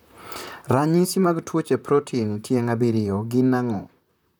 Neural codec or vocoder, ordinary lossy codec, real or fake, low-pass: vocoder, 44.1 kHz, 128 mel bands, Pupu-Vocoder; none; fake; none